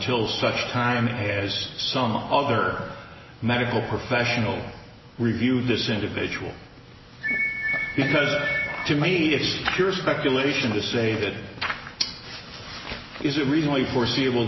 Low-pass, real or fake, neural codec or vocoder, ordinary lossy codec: 7.2 kHz; real; none; MP3, 24 kbps